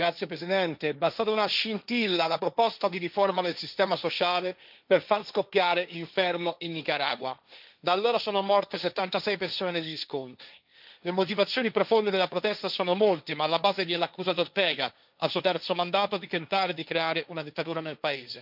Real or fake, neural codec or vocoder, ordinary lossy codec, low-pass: fake; codec, 16 kHz, 1.1 kbps, Voila-Tokenizer; none; 5.4 kHz